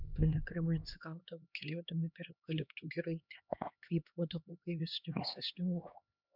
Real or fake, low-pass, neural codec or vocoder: fake; 5.4 kHz; codec, 16 kHz, 2 kbps, X-Codec, HuBERT features, trained on LibriSpeech